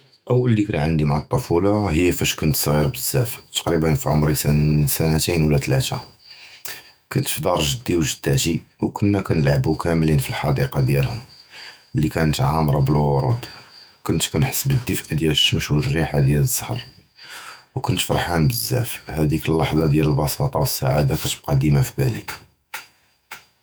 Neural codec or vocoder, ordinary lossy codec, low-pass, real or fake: autoencoder, 48 kHz, 128 numbers a frame, DAC-VAE, trained on Japanese speech; none; none; fake